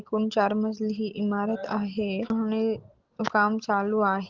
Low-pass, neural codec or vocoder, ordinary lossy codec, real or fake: 7.2 kHz; none; Opus, 16 kbps; real